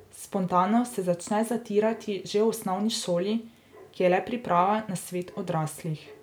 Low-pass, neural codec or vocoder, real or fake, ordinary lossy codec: none; none; real; none